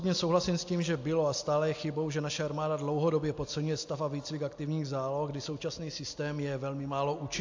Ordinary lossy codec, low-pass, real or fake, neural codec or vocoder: AAC, 48 kbps; 7.2 kHz; real; none